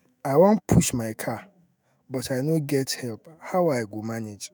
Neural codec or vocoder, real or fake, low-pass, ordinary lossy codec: autoencoder, 48 kHz, 128 numbers a frame, DAC-VAE, trained on Japanese speech; fake; none; none